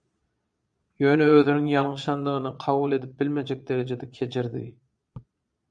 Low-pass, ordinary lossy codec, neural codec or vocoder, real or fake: 9.9 kHz; MP3, 96 kbps; vocoder, 22.05 kHz, 80 mel bands, Vocos; fake